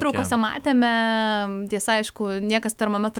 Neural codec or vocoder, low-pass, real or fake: autoencoder, 48 kHz, 128 numbers a frame, DAC-VAE, trained on Japanese speech; 19.8 kHz; fake